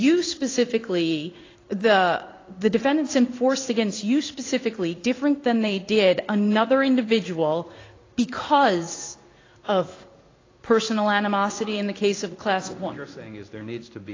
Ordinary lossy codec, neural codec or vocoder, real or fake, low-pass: AAC, 32 kbps; codec, 16 kHz in and 24 kHz out, 1 kbps, XY-Tokenizer; fake; 7.2 kHz